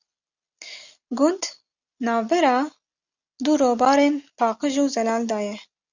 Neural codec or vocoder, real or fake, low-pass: none; real; 7.2 kHz